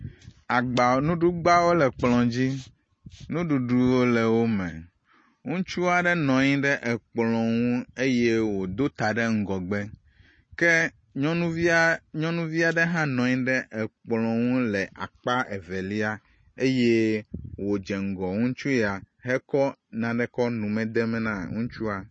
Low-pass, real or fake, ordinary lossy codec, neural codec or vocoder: 9.9 kHz; real; MP3, 32 kbps; none